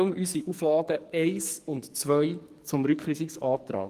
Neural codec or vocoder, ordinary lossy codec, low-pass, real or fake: codec, 44.1 kHz, 2.6 kbps, SNAC; Opus, 24 kbps; 14.4 kHz; fake